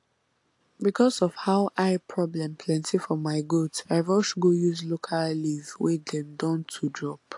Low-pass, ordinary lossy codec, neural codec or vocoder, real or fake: 10.8 kHz; AAC, 64 kbps; none; real